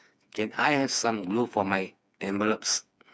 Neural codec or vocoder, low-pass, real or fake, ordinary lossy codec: codec, 16 kHz, 4 kbps, FreqCodec, smaller model; none; fake; none